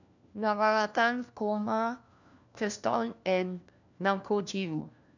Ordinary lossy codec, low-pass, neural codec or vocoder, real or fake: none; 7.2 kHz; codec, 16 kHz, 1 kbps, FunCodec, trained on LibriTTS, 50 frames a second; fake